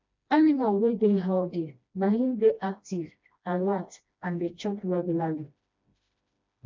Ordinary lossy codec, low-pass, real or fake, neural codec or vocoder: MP3, 64 kbps; 7.2 kHz; fake; codec, 16 kHz, 1 kbps, FreqCodec, smaller model